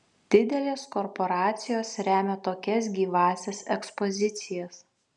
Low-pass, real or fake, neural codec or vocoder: 10.8 kHz; real; none